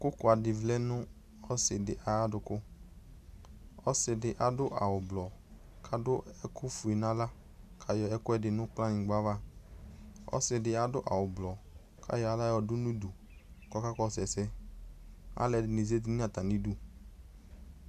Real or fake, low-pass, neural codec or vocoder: real; 14.4 kHz; none